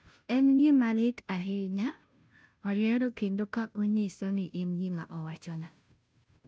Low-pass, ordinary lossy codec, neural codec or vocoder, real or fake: none; none; codec, 16 kHz, 0.5 kbps, FunCodec, trained on Chinese and English, 25 frames a second; fake